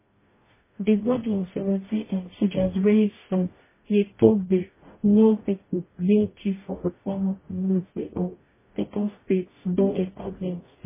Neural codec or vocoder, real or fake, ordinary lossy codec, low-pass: codec, 44.1 kHz, 0.9 kbps, DAC; fake; MP3, 16 kbps; 3.6 kHz